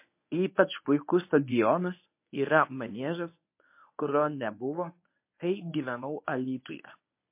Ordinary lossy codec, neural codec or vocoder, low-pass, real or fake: MP3, 24 kbps; codec, 24 kHz, 0.9 kbps, WavTokenizer, medium speech release version 1; 3.6 kHz; fake